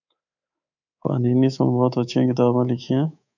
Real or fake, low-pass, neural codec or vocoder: fake; 7.2 kHz; codec, 24 kHz, 3.1 kbps, DualCodec